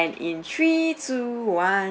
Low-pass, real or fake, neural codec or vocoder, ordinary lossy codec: none; real; none; none